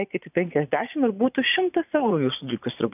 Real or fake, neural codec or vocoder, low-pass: fake; vocoder, 22.05 kHz, 80 mel bands, Vocos; 3.6 kHz